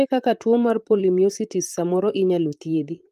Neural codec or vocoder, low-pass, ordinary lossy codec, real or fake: vocoder, 44.1 kHz, 128 mel bands, Pupu-Vocoder; 14.4 kHz; Opus, 32 kbps; fake